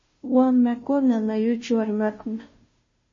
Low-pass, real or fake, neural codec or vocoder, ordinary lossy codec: 7.2 kHz; fake; codec, 16 kHz, 0.5 kbps, FunCodec, trained on Chinese and English, 25 frames a second; MP3, 32 kbps